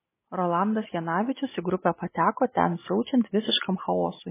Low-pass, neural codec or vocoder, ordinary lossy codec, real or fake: 3.6 kHz; none; MP3, 16 kbps; real